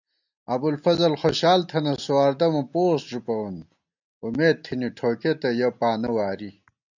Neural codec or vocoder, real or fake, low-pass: none; real; 7.2 kHz